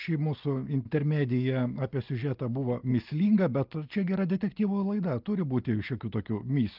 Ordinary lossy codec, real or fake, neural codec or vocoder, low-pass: Opus, 24 kbps; real; none; 5.4 kHz